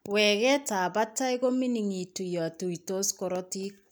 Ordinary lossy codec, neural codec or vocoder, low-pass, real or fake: none; none; none; real